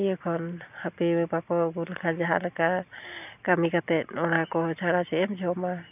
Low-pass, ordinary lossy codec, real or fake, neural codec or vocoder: 3.6 kHz; none; real; none